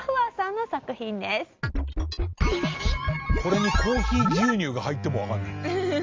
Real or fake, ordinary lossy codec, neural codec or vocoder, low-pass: real; Opus, 32 kbps; none; 7.2 kHz